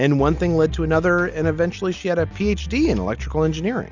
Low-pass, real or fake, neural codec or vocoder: 7.2 kHz; real; none